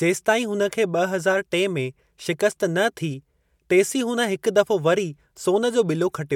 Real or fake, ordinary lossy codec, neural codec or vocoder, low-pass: real; MP3, 96 kbps; none; 19.8 kHz